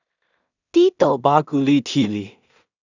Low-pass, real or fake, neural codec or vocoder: 7.2 kHz; fake; codec, 16 kHz in and 24 kHz out, 0.4 kbps, LongCat-Audio-Codec, two codebook decoder